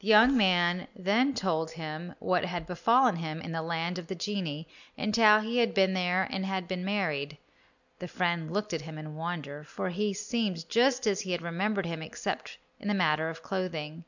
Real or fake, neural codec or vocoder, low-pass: real; none; 7.2 kHz